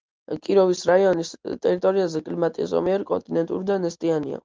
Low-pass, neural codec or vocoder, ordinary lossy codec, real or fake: 7.2 kHz; none; Opus, 24 kbps; real